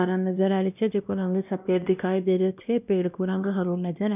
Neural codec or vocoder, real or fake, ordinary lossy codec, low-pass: codec, 16 kHz, 1 kbps, X-Codec, WavLM features, trained on Multilingual LibriSpeech; fake; none; 3.6 kHz